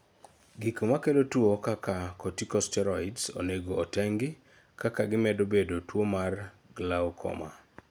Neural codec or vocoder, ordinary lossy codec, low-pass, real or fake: none; none; none; real